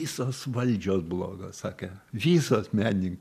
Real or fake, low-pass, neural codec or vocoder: real; 14.4 kHz; none